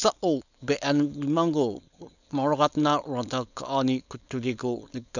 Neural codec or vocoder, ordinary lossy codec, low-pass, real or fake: codec, 16 kHz, 4.8 kbps, FACodec; none; 7.2 kHz; fake